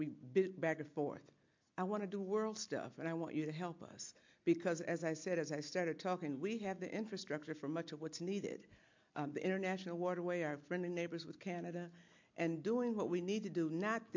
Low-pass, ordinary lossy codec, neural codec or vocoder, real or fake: 7.2 kHz; MP3, 48 kbps; none; real